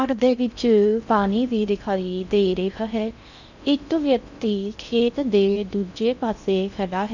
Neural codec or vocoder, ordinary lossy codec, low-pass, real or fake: codec, 16 kHz in and 24 kHz out, 0.6 kbps, FocalCodec, streaming, 4096 codes; none; 7.2 kHz; fake